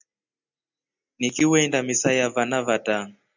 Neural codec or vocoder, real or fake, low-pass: none; real; 7.2 kHz